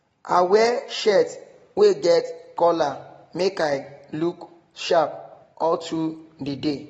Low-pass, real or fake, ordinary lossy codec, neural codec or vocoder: 19.8 kHz; real; AAC, 24 kbps; none